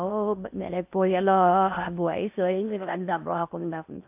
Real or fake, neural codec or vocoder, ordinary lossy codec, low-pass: fake; codec, 16 kHz in and 24 kHz out, 0.6 kbps, FocalCodec, streaming, 2048 codes; AAC, 32 kbps; 3.6 kHz